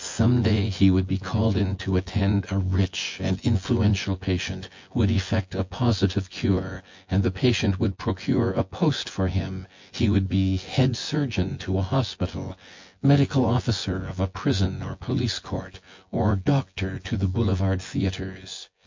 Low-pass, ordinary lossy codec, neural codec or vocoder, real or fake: 7.2 kHz; MP3, 48 kbps; vocoder, 24 kHz, 100 mel bands, Vocos; fake